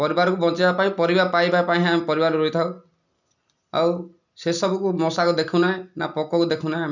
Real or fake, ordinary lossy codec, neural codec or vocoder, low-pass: real; none; none; 7.2 kHz